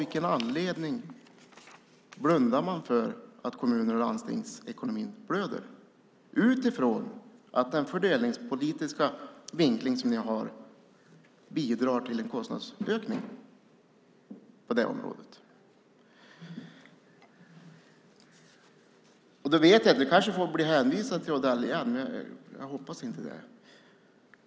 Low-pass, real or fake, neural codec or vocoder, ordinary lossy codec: none; real; none; none